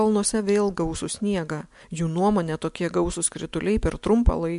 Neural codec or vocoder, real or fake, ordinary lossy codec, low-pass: none; real; MP3, 64 kbps; 10.8 kHz